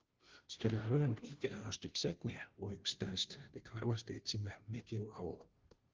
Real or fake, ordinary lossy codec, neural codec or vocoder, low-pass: fake; Opus, 16 kbps; codec, 16 kHz, 0.5 kbps, FunCodec, trained on Chinese and English, 25 frames a second; 7.2 kHz